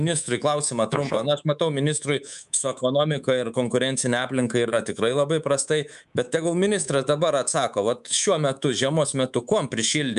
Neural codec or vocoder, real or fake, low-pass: codec, 24 kHz, 3.1 kbps, DualCodec; fake; 10.8 kHz